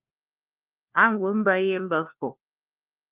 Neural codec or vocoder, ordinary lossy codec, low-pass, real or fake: codec, 16 kHz, 1 kbps, FunCodec, trained on LibriTTS, 50 frames a second; Opus, 24 kbps; 3.6 kHz; fake